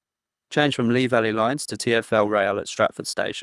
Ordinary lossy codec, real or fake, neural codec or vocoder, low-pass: none; fake; codec, 24 kHz, 3 kbps, HILCodec; none